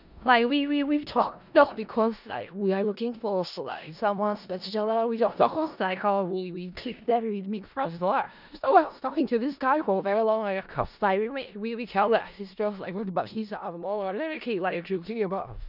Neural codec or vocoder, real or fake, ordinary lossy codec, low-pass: codec, 16 kHz in and 24 kHz out, 0.4 kbps, LongCat-Audio-Codec, four codebook decoder; fake; none; 5.4 kHz